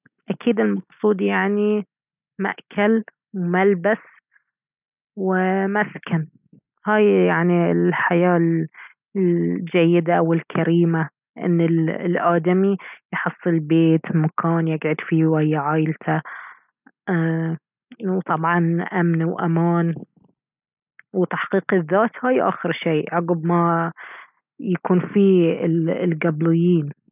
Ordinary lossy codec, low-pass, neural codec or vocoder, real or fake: none; 3.6 kHz; none; real